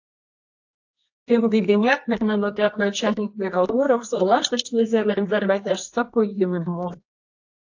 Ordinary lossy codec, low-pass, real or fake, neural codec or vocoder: AAC, 48 kbps; 7.2 kHz; fake; codec, 24 kHz, 0.9 kbps, WavTokenizer, medium music audio release